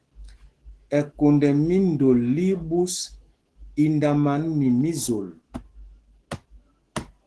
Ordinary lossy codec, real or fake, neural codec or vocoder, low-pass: Opus, 16 kbps; fake; autoencoder, 48 kHz, 128 numbers a frame, DAC-VAE, trained on Japanese speech; 10.8 kHz